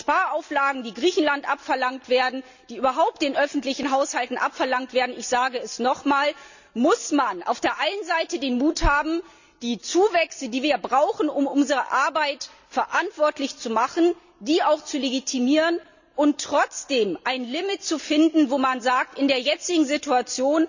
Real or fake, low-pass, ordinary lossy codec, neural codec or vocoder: real; 7.2 kHz; none; none